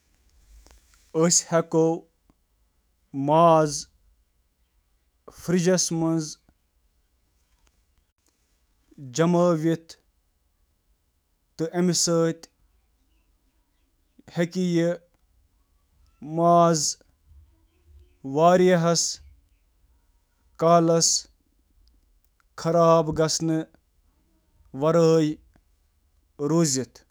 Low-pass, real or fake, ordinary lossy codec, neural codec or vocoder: none; fake; none; autoencoder, 48 kHz, 128 numbers a frame, DAC-VAE, trained on Japanese speech